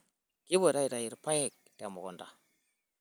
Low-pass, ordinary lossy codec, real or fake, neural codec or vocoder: none; none; real; none